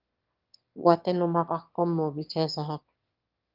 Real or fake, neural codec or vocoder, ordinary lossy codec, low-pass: fake; autoencoder, 22.05 kHz, a latent of 192 numbers a frame, VITS, trained on one speaker; Opus, 32 kbps; 5.4 kHz